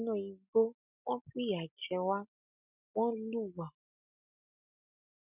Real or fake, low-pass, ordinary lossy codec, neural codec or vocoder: real; 3.6 kHz; none; none